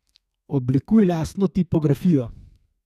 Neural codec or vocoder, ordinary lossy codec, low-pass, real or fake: codec, 32 kHz, 1.9 kbps, SNAC; none; 14.4 kHz; fake